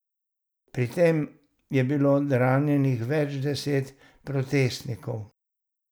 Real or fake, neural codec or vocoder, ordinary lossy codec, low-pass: real; none; none; none